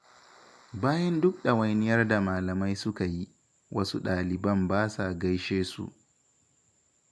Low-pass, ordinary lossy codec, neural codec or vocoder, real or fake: none; none; none; real